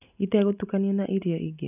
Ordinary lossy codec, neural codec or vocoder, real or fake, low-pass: none; none; real; 3.6 kHz